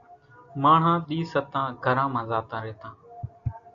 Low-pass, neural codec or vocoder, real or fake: 7.2 kHz; none; real